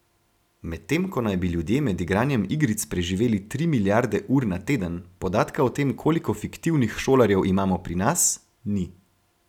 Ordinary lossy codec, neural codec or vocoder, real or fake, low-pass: none; none; real; 19.8 kHz